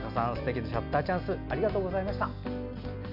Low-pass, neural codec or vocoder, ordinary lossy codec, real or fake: 5.4 kHz; none; none; real